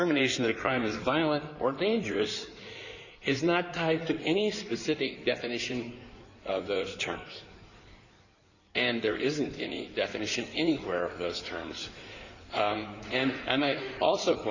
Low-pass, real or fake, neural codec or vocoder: 7.2 kHz; fake; codec, 16 kHz in and 24 kHz out, 2.2 kbps, FireRedTTS-2 codec